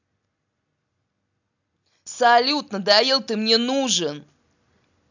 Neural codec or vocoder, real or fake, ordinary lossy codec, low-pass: none; real; none; 7.2 kHz